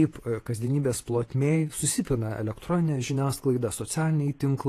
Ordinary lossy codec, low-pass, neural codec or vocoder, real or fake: AAC, 48 kbps; 14.4 kHz; vocoder, 48 kHz, 128 mel bands, Vocos; fake